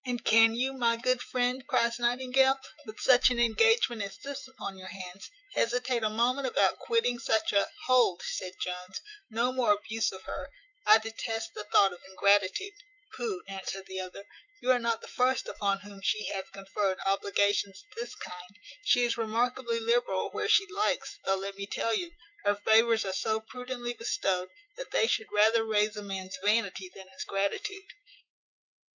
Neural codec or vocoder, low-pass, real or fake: autoencoder, 48 kHz, 128 numbers a frame, DAC-VAE, trained on Japanese speech; 7.2 kHz; fake